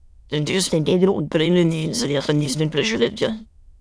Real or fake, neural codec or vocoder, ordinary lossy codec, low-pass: fake; autoencoder, 22.05 kHz, a latent of 192 numbers a frame, VITS, trained on many speakers; none; none